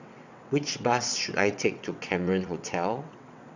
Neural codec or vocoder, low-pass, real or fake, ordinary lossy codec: vocoder, 22.05 kHz, 80 mel bands, WaveNeXt; 7.2 kHz; fake; none